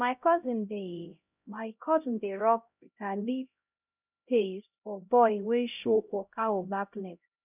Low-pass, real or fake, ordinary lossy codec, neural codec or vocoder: 3.6 kHz; fake; none; codec, 16 kHz, 0.5 kbps, X-Codec, HuBERT features, trained on LibriSpeech